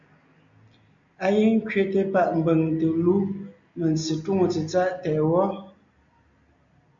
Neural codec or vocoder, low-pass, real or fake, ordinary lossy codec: none; 7.2 kHz; real; MP3, 96 kbps